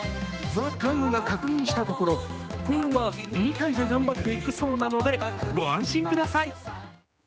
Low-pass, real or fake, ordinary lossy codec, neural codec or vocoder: none; fake; none; codec, 16 kHz, 2 kbps, X-Codec, HuBERT features, trained on general audio